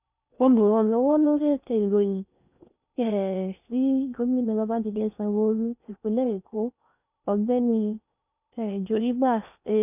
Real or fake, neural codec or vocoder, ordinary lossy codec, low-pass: fake; codec, 16 kHz in and 24 kHz out, 0.6 kbps, FocalCodec, streaming, 4096 codes; none; 3.6 kHz